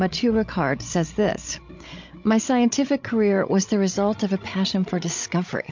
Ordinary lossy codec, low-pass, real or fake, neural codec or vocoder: MP3, 48 kbps; 7.2 kHz; fake; codec, 16 kHz, 16 kbps, FreqCodec, larger model